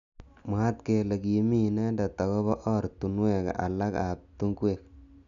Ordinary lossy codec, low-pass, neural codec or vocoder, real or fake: MP3, 96 kbps; 7.2 kHz; none; real